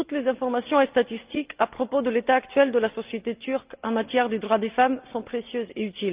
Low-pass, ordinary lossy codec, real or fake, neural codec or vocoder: 3.6 kHz; Opus, 24 kbps; real; none